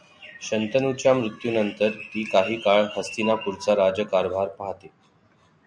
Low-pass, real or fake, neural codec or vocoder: 9.9 kHz; real; none